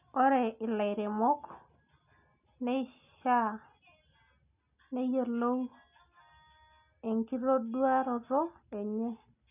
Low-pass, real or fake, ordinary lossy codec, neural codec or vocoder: 3.6 kHz; real; none; none